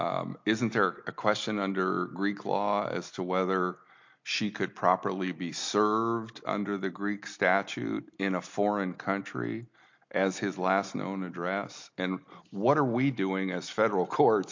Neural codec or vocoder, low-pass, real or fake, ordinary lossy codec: none; 7.2 kHz; real; MP3, 48 kbps